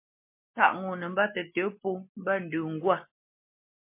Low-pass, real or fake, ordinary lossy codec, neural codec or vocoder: 3.6 kHz; real; MP3, 24 kbps; none